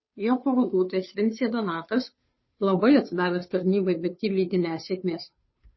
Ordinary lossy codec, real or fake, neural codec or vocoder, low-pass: MP3, 24 kbps; fake; codec, 16 kHz, 2 kbps, FunCodec, trained on Chinese and English, 25 frames a second; 7.2 kHz